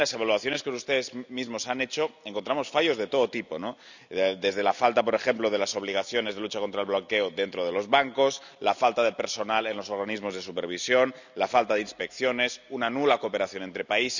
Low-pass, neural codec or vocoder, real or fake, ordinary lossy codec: 7.2 kHz; none; real; none